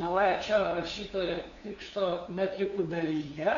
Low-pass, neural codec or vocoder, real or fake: 7.2 kHz; codec, 16 kHz, 2 kbps, FunCodec, trained on LibriTTS, 25 frames a second; fake